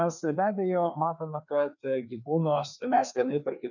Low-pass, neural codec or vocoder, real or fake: 7.2 kHz; codec, 16 kHz, 2 kbps, FreqCodec, larger model; fake